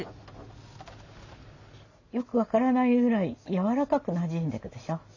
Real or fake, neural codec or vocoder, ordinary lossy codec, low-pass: real; none; none; 7.2 kHz